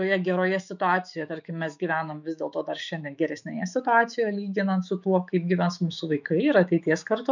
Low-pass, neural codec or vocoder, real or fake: 7.2 kHz; autoencoder, 48 kHz, 128 numbers a frame, DAC-VAE, trained on Japanese speech; fake